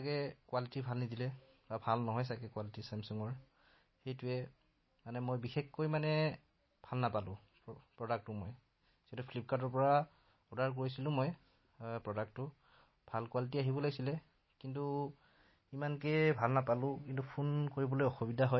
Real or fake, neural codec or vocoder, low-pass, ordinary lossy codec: real; none; 7.2 kHz; MP3, 24 kbps